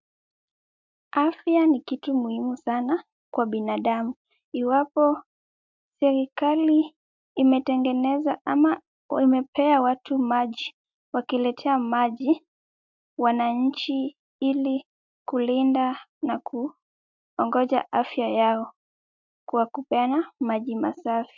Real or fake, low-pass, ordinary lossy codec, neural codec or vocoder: real; 7.2 kHz; MP3, 64 kbps; none